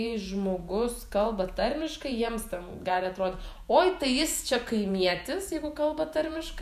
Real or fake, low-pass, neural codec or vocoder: fake; 14.4 kHz; vocoder, 48 kHz, 128 mel bands, Vocos